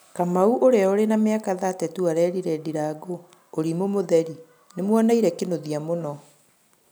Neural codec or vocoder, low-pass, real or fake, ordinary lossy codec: none; none; real; none